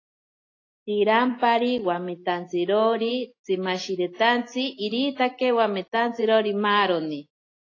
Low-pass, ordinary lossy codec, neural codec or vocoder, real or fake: 7.2 kHz; AAC, 32 kbps; none; real